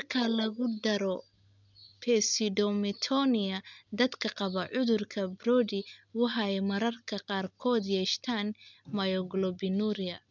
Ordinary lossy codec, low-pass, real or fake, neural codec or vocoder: none; 7.2 kHz; real; none